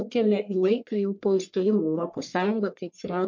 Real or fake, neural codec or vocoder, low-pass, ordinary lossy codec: fake; codec, 44.1 kHz, 1.7 kbps, Pupu-Codec; 7.2 kHz; MP3, 48 kbps